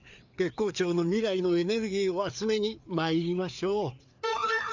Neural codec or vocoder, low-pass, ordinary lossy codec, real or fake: codec, 16 kHz, 4 kbps, FreqCodec, larger model; 7.2 kHz; MP3, 64 kbps; fake